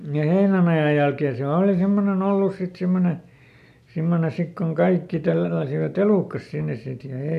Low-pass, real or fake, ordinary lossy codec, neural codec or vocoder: 14.4 kHz; real; none; none